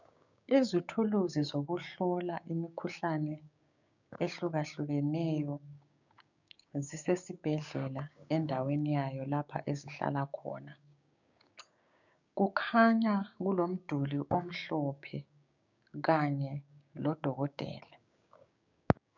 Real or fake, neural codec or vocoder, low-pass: fake; codec, 16 kHz, 6 kbps, DAC; 7.2 kHz